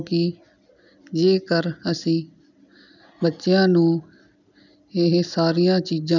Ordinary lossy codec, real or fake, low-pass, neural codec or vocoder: none; real; 7.2 kHz; none